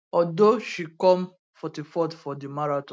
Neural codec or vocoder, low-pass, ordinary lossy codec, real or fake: none; none; none; real